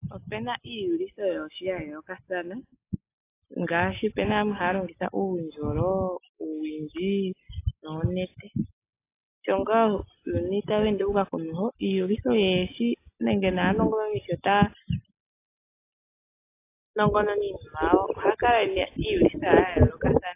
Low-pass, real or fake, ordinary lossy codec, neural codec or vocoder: 3.6 kHz; real; AAC, 24 kbps; none